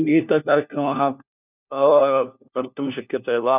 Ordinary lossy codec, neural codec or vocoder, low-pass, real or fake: none; codec, 16 kHz, 1 kbps, FunCodec, trained on LibriTTS, 50 frames a second; 3.6 kHz; fake